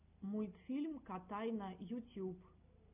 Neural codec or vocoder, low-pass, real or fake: none; 3.6 kHz; real